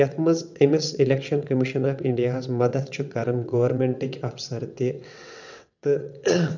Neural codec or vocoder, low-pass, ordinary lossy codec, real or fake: vocoder, 22.05 kHz, 80 mel bands, WaveNeXt; 7.2 kHz; none; fake